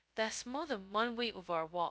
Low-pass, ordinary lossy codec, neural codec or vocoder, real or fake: none; none; codec, 16 kHz, 0.2 kbps, FocalCodec; fake